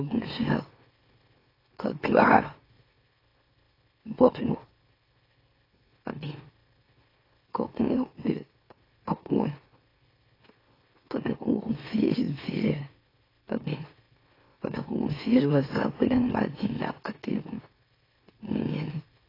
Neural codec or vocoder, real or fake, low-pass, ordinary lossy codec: autoencoder, 44.1 kHz, a latent of 192 numbers a frame, MeloTTS; fake; 5.4 kHz; AAC, 24 kbps